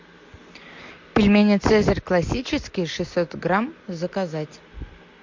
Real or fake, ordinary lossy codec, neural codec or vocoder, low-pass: real; MP3, 48 kbps; none; 7.2 kHz